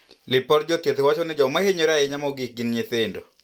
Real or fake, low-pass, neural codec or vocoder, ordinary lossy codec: real; 19.8 kHz; none; Opus, 32 kbps